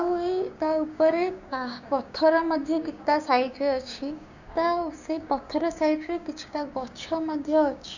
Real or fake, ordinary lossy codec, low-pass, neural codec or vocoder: fake; none; 7.2 kHz; codec, 16 kHz, 6 kbps, DAC